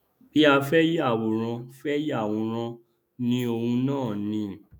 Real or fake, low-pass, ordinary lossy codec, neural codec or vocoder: fake; none; none; autoencoder, 48 kHz, 128 numbers a frame, DAC-VAE, trained on Japanese speech